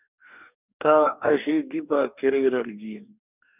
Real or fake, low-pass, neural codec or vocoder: fake; 3.6 kHz; codec, 44.1 kHz, 2.6 kbps, DAC